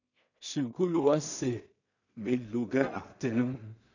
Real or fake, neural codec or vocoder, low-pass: fake; codec, 16 kHz in and 24 kHz out, 0.4 kbps, LongCat-Audio-Codec, two codebook decoder; 7.2 kHz